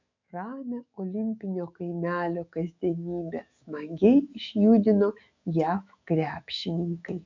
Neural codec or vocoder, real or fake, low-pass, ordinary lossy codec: autoencoder, 48 kHz, 128 numbers a frame, DAC-VAE, trained on Japanese speech; fake; 7.2 kHz; MP3, 64 kbps